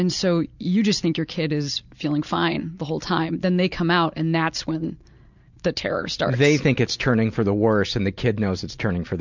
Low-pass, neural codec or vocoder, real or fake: 7.2 kHz; none; real